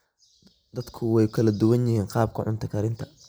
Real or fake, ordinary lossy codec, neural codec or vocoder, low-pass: real; none; none; none